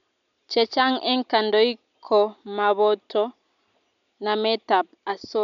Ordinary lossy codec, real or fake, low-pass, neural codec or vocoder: none; real; 7.2 kHz; none